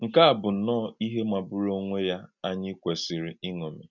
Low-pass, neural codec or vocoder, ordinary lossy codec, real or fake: 7.2 kHz; none; none; real